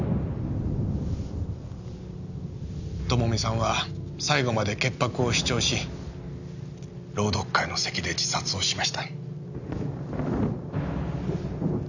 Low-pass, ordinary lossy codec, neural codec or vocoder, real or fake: 7.2 kHz; none; none; real